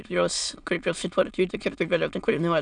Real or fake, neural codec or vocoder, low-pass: fake; autoencoder, 22.05 kHz, a latent of 192 numbers a frame, VITS, trained on many speakers; 9.9 kHz